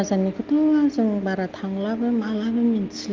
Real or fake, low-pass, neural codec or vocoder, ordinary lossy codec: real; 7.2 kHz; none; Opus, 32 kbps